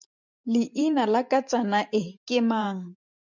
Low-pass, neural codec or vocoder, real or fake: 7.2 kHz; vocoder, 44.1 kHz, 128 mel bands every 512 samples, BigVGAN v2; fake